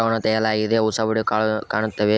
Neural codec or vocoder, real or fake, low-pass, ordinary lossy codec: none; real; none; none